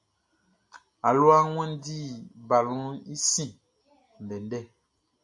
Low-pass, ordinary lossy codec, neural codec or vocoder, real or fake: 10.8 kHz; MP3, 48 kbps; none; real